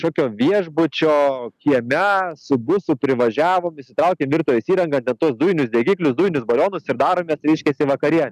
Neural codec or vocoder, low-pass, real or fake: none; 14.4 kHz; real